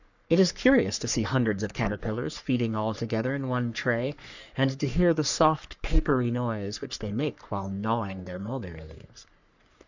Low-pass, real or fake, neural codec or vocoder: 7.2 kHz; fake; codec, 44.1 kHz, 3.4 kbps, Pupu-Codec